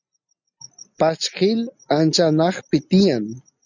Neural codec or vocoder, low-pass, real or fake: none; 7.2 kHz; real